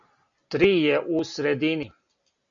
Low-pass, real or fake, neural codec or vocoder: 7.2 kHz; real; none